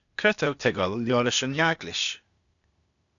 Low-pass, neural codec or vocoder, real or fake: 7.2 kHz; codec, 16 kHz, 0.8 kbps, ZipCodec; fake